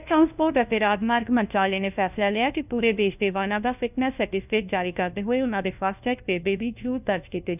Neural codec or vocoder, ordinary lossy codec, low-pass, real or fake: codec, 16 kHz, 1 kbps, FunCodec, trained on LibriTTS, 50 frames a second; none; 3.6 kHz; fake